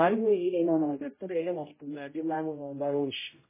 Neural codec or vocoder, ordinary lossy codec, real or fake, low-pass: codec, 16 kHz, 0.5 kbps, X-Codec, HuBERT features, trained on general audio; MP3, 16 kbps; fake; 3.6 kHz